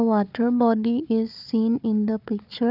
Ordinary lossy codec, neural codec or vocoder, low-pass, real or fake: none; codec, 16 kHz, 4 kbps, FunCodec, trained on Chinese and English, 50 frames a second; 5.4 kHz; fake